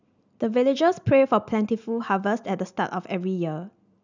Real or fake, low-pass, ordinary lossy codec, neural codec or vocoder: real; 7.2 kHz; none; none